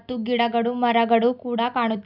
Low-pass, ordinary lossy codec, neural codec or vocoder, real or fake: 5.4 kHz; none; none; real